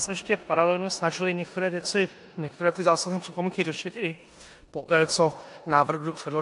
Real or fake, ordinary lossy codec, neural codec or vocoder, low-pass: fake; AAC, 64 kbps; codec, 16 kHz in and 24 kHz out, 0.9 kbps, LongCat-Audio-Codec, four codebook decoder; 10.8 kHz